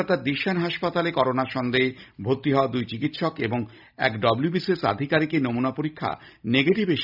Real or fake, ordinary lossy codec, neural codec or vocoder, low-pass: real; none; none; 5.4 kHz